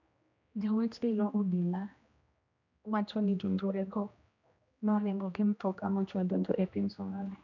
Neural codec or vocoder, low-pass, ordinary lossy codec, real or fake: codec, 16 kHz, 1 kbps, X-Codec, HuBERT features, trained on general audio; 7.2 kHz; none; fake